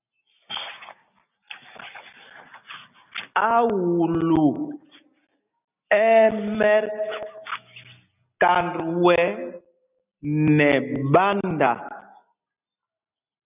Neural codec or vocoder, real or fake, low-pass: none; real; 3.6 kHz